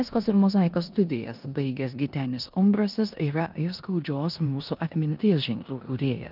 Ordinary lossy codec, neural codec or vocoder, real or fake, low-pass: Opus, 24 kbps; codec, 16 kHz in and 24 kHz out, 0.9 kbps, LongCat-Audio-Codec, four codebook decoder; fake; 5.4 kHz